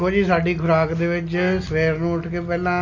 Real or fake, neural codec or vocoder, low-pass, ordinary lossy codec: real; none; 7.2 kHz; none